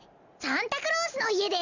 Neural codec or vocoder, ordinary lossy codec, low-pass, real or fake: none; none; 7.2 kHz; real